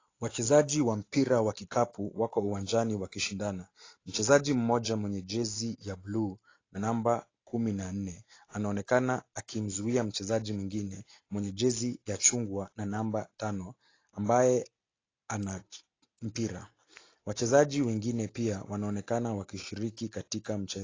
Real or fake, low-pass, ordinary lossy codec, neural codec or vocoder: real; 7.2 kHz; AAC, 32 kbps; none